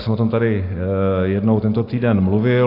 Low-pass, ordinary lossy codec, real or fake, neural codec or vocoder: 5.4 kHz; AAC, 32 kbps; real; none